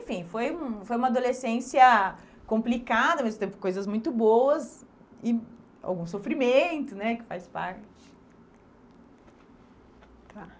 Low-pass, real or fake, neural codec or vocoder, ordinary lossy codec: none; real; none; none